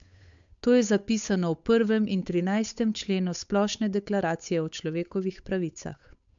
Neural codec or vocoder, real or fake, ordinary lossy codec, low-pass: codec, 16 kHz, 8 kbps, FunCodec, trained on Chinese and English, 25 frames a second; fake; MP3, 64 kbps; 7.2 kHz